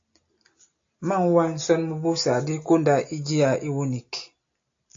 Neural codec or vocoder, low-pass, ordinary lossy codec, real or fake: none; 7.2 kHz; AAC, 48 kbps; real